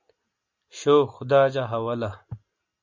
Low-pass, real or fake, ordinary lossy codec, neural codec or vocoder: 7.2 kHz; real; MP3, 64 kbps; none